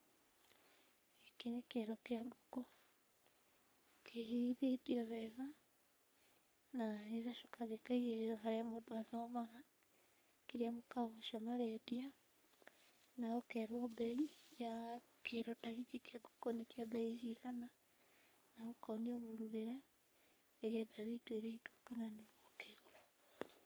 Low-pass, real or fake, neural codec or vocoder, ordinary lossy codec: none; fake; codec, 44.1 kHz, 3.4 kbps, Pupu-Codec; none